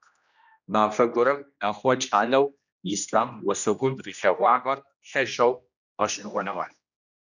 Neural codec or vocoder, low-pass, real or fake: codec, 16 kHz, 1 kbps, X-Codec, HuBERT features, trained on general audio; 7.2 kHz; fake